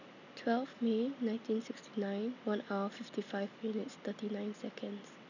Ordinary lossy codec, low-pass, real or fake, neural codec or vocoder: none; 7.2 kHz; real; none